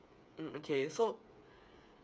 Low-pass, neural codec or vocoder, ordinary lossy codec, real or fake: none; codec, 16 kHz, 8 kbps, FreqCodec, smaller model; none; fake